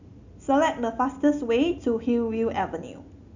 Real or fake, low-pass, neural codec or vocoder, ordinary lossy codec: real; 7.2 kHz; none; none